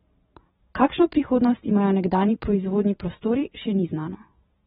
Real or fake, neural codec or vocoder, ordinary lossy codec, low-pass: fake; vocoder, 44.1 kHz, 128 mel bands every 512 samples, BigVGAN v2; AAC, 16 kbps; 19.8 kHz